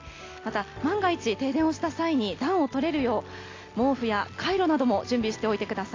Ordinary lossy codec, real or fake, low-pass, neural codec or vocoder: AAC, 32 kbps; real; 7.2 kHz; none